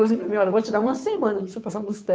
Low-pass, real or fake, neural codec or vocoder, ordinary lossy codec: none; fake; codec, 16 kHz, 2 kbps, FunCodec, trained on Chinese and English, 25 frames a second; none